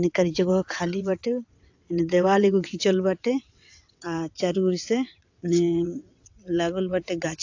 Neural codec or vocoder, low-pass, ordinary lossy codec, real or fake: vocoder, 22.05 kHz, 80 mel bands, WaveNeXt; 7.2 kHz; AAC, 48 kbps; fake